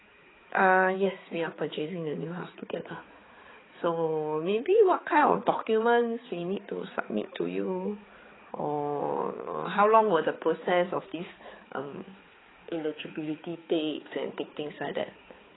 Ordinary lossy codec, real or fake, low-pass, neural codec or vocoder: AAC, 16 kbps; fake; 7.2 kHz; codec, 16 kHz, 4 kbps, X-Codec, HuBERT features, trained on balanced general audio